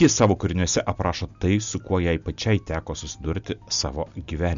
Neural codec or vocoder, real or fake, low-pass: none; real; 7.2 kHz